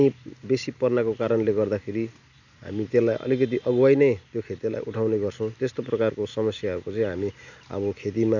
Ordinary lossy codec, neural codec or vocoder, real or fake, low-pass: none; none; real; 7.2 kHz